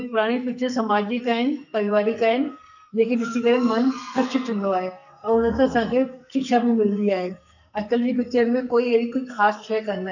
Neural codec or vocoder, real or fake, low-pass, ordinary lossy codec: codec, 44.1 kHz, 2.6 kbps, SNAC; fake; 7.2 kHz; none